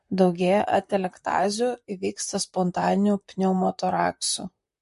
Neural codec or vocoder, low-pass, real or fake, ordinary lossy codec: vocoder, 44.1 kHz, 128 mel bands, Pupu-Vocoder; 14.4 kHz; fake; MP3, 48 kbps